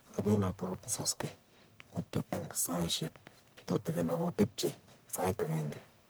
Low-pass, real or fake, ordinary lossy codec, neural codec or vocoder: none; fake; none; codec, 44.1 kHz, 1.7 kbps, Pupu-Codec